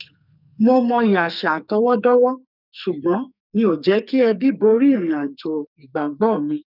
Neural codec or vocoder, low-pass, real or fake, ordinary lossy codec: codec, 44.1 kHz, 2.6 kbps, SNAC; 5.4 kHz; fake; none